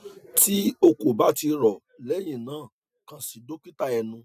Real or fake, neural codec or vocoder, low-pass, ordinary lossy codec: real; none; 14.4 kHz; none